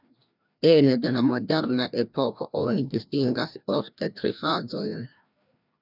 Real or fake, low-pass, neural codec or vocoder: fake; 5.4 kHz; codec, 16 kHz, 1 kbps, FreqCodec, larger model